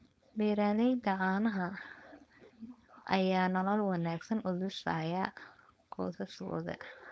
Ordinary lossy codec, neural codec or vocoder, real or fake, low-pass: none; codec, 16 kHz, 4.8 kbps, FACodec; fake; none